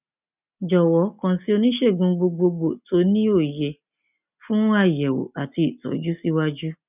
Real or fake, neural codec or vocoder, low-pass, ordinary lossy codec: real; none; 3.6 kHz; none